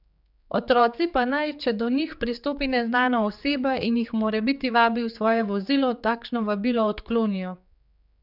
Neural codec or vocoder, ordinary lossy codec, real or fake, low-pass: codec, 16 kHz, 4 kbps, X-Codec, HuBERT features, trained on general audio; none; fake; 5.4 kHz